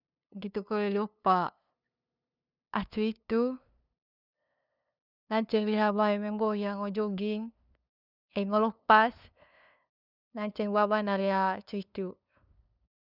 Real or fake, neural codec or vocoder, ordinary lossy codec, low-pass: fake; codec, 16 kHz, 2 kbps, FunCodec, trained on LibriTTS, 25 frames a second; none; 5.4 kHz